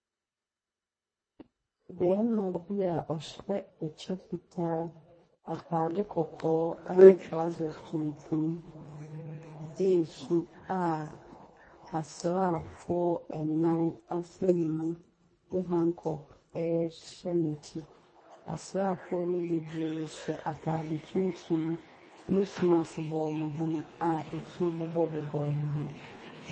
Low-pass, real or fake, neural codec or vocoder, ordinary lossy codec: 9.9 kHz; fake; codec, 24 kHz, 1.5 kbps, HILCodec; MP3, 32 kbps